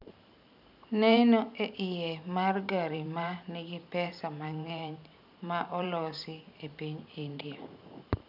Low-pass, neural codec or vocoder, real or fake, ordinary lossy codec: 5.4 kHz; vocoder, 44.1 kHz, 128 mel bands every 512 samples, BigVGAN v2; fake; none